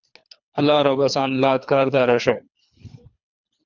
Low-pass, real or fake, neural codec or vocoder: 7.2 kHz; fake; codec, 24 kHz, 3 kbps, HILCodec